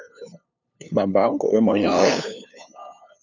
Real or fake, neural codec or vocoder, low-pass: fake; codec, 16 kHz, 2 kbps, FunCodec, trained on LibriTTS, 25 frames a second; 7.2 kHz